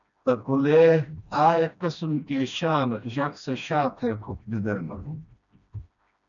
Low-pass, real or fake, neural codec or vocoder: 7.2 kHz; fake; codec, 16 kHz, 1 kbps, FreqCodec, smaller model